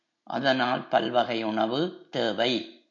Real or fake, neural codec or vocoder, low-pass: real; none; 7.2 kHz